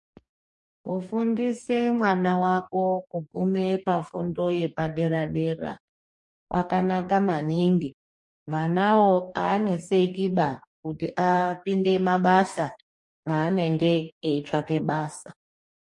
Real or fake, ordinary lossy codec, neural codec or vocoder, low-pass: fake; MP3, 48 kbps; codec, 44.1 kHz, 2.6 kbps, DAC; 10.8 kHz